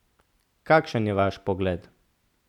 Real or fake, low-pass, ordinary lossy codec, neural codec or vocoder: real; 19.8 kHz; none; none